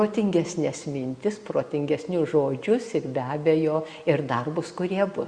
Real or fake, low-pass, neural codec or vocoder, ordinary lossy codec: real; 9.9 kHz; none; Opus, 64 kbps